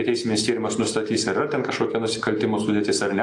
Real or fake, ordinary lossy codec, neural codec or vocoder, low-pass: real; AAC, 48 kbps; none; 10.8 kHz